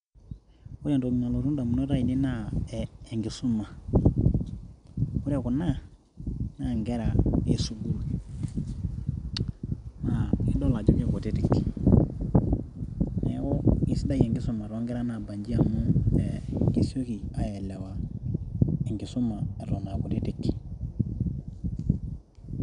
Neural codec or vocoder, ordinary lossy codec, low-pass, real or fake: none; none; 9.9 kHz; real